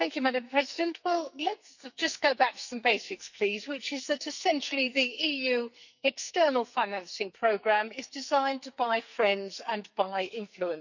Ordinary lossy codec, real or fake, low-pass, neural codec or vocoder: none; fake; 7.2 kHz; codec, 44.1 kHz, 2.6 kbps, SNAC